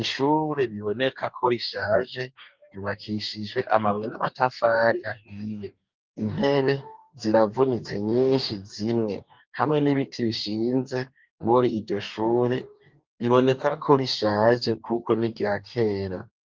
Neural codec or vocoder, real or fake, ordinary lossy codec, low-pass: codec, 44.1 kHz, 2.6 kbps, DAC; fake; Opus, 24 kbps; 7.2 kHz